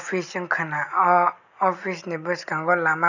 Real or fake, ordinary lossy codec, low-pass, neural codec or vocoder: real; none; 7.2 kHz; none